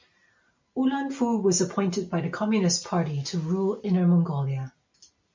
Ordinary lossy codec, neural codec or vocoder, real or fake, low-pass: MP3, 64 kbps; none; real; 7.2 kHz